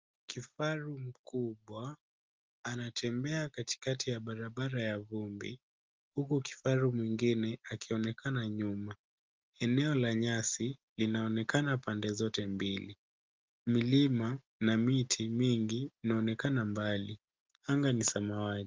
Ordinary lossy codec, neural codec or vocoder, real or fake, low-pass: Opus, 32 kbps; none; real; 7.2 kHz